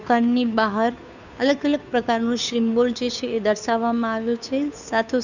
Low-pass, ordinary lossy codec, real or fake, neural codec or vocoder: 7.2 kHz; none; fake; codec, 16 kHz, 2 kbps, FunCodec, trained on Chinese and English, 25 frames a second